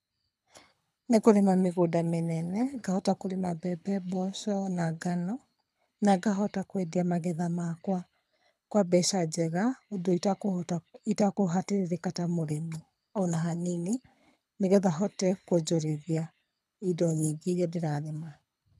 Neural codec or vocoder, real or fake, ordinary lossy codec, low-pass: codec, 24 kHz, 6 kbps, HILCodec; fake; none; none